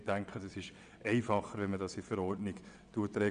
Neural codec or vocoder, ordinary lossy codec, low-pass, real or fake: vocoder, 22.05 kHz, 80 mel bands, WaveNeXt; none; 9.9 kHz; fake